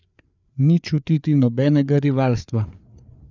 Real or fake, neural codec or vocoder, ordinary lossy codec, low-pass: fake; codec, 16 kHz, 4 kbps, FreqCodec, larger model; none; 7.2 kHz